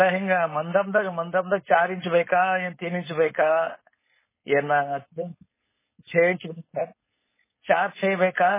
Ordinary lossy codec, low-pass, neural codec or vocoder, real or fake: MP3, 16 kbps; 3.6 kHz; codec, 16 kHz, 4.8 kbps, FACodec; fake